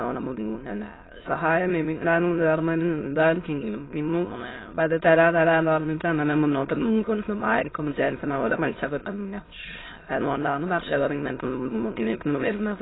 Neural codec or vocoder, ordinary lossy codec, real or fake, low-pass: autoencoder, 22.05 kHz, a latent of 192 numbers a frame, VITS, trained on many speakers; AAC, 16 kbps; fake; 7.2 kHz